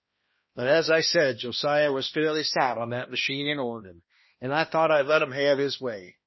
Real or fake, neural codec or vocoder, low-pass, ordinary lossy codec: fake; codec, 16 kHz, 1 kbps, X-Codec, HuBERT features, trained on balanced general audio; 7.2 kHz; MP3, 24 kbps